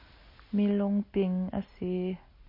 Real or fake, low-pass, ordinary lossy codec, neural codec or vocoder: real; 5.4 kHz; MP3, 32 kbps; none